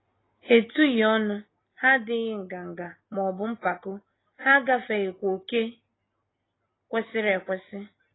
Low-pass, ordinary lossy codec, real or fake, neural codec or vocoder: 7.2 kHz; AAC, 16 kbps; real; none